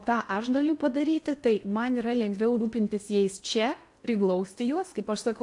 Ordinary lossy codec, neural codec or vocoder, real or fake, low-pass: AAC, 64 kbps; codec, 16 kHz in and 24 kHz out, 0.8 kbps, FocalCodec, streaming, 65536 codes; fake; 10.8 kHz